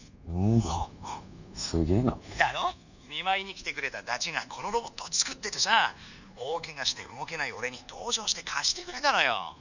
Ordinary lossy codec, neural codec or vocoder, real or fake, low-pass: none; codec, 24 kHz, 1.2 kbps, DualCodec; fake; 7.2 kHz